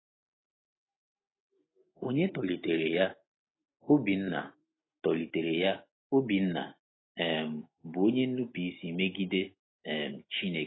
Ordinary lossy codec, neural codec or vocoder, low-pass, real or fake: AAC, 16 kbps; none; 7.2 kHz; real